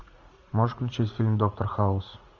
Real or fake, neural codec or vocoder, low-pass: fake; vocoder, 44.1 kHz, 80 mel bands, Vocos; 7.2 kHz